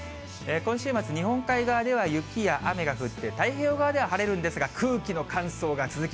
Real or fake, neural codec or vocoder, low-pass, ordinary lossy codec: real; none; none; none